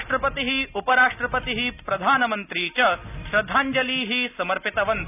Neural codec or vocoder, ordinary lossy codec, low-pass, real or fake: none; none; 3.6 kHz; real